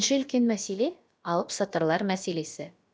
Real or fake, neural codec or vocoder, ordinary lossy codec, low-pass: fake; codec, 16 kHz, about 1 kbps, DyCAST, with the encoder's durations; none; none